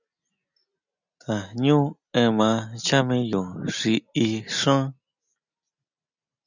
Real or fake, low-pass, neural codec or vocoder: real; 7.2 kHz; none